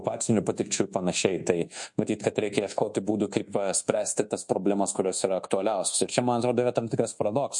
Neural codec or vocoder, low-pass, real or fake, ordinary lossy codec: codec, 24 kHz, 1.2 kbps, DualCodec; 10.8 kHz; fake; MP3, 48 kbps